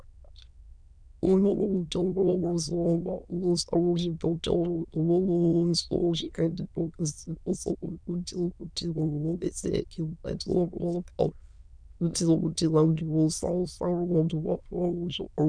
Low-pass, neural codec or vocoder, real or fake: 9.9 kHz; autoencoder, 22.05 kHz, a latent of 192 numbers a frame, VITS, trained on many speakers; fake